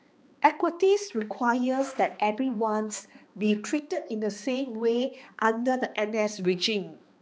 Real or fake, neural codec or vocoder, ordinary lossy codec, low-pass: fake; codec, 16 kHz, 2 kbps, X-Codec, HuBERT features, trained on balanced general audio; none; none